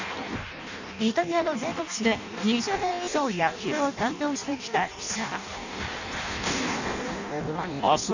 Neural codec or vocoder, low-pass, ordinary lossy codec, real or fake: codec, 16 kHz in and 24 kHz out, 0.6 kbps, FireRedTTS-2 codec; 7.2 kHz; none; fake